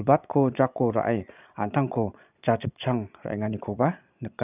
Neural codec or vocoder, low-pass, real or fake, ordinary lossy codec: vocoder, 22.05 kHz, 80 mel bands, Vocos; 3.6 kHz; fake; none